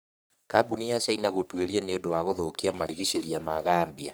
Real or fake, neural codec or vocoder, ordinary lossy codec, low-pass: fake; codec, 44.1 kHz, 3.4 kbps, Pupu-Codec; none; none